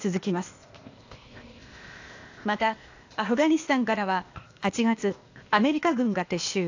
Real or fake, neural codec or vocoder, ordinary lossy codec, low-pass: fake; codec, 16 kHz, 0.8 kbps, ZipCodec; AAC, 48 kbps; 7.2 kHz